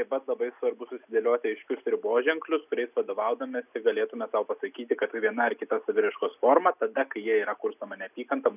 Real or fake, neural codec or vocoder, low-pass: real; none; 3.6 kHz